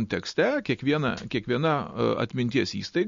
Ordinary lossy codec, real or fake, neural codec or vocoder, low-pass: MP3, 48 kbps; real; none; 7.2 kHz